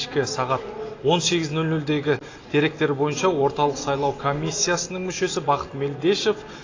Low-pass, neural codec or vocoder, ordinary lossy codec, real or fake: 7.2 kHz; none; AAC, 32 kbps; real